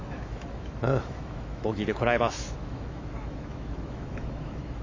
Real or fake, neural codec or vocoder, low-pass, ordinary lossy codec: fake; autoencoder, 48 kHz, 128 numbers a frame, DAC-VAE, trained on Japanese speech; 7.2 kHz; AAC, 32 kbps